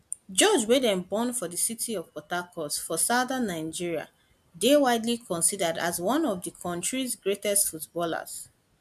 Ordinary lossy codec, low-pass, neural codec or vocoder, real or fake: MP3, 96 kbps; 14.4 kHz; none; real